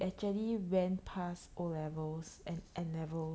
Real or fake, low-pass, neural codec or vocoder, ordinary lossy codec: real; none; none; none